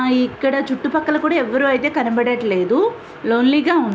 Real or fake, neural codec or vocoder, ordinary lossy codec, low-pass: real; none; none; none